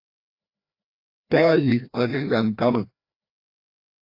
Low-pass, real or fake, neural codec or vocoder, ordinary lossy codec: 5.4 kHz; fake; codec, 16 kHz, 1 kbps, FreqCodec, larger model; AAC, 32 kbps